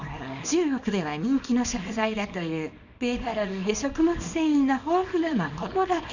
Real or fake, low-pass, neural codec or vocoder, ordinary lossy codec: fake; 7.2 kHz; codec, 24 kHz, 0.9 kbps, WavTokenizer, small release; none